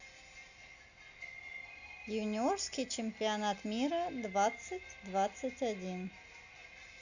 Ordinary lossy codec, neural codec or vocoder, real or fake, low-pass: none; none; real; 7.2 kHz